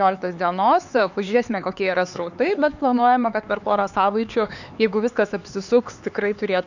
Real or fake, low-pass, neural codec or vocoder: fake; 7.2 kHz; codec, 16 kHz, 4 kbps, X-Codec, HuBERT features, trained on LibriSpeech